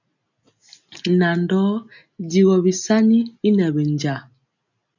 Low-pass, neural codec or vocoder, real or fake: 7.2 kHz; none; real